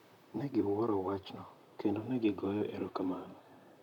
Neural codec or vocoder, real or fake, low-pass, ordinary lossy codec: vocoder, 44.1 kHz, 128 mel bands, Pupu-Vocoder; fake; 19.8 kHz; none